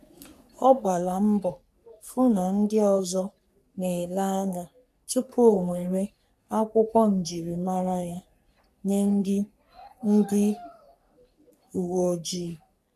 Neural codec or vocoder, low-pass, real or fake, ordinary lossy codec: codec, 44.1 kHz, 3.4 kbps, Pupu-Codec; 14.4 kHz; fake; none